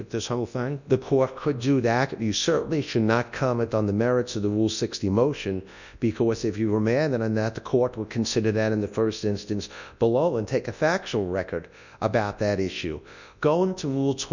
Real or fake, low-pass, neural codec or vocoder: fake; 7.2 kHz; codec, 24 kHz, 0.9 kbps, WavTokenizer, large speech release